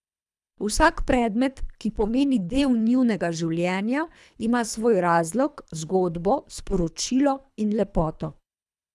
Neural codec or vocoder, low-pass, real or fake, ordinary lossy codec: codec, 24 kHz, 3 kbps, HILCodec; none; fake; none